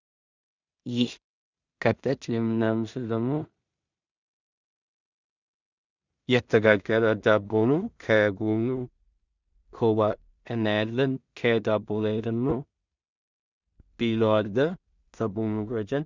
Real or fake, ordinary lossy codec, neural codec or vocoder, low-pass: fake; Opus, 64 kbps; codec, 16 kHz in and 24 kHz out, 0.4 kbps, LongCat-Audio-Codec, two codebook decoder; 7.2 kHz